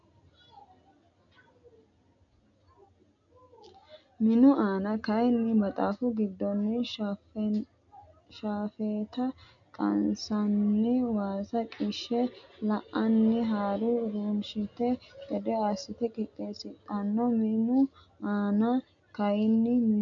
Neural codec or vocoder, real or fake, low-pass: none; real; 7.2 kHz